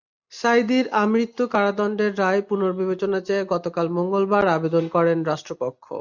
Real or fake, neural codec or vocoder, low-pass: real; none; 7.2 kHz